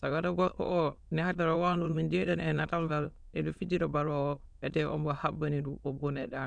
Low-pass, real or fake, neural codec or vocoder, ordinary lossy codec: 9.9 kHz; fake; autoencoder, 22.05 kHz, a latent of 192 numbers a frame, VITS, trained on many speakers; none